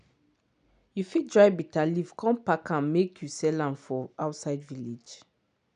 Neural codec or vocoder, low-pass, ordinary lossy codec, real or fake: none; 9.9 kHz; none; real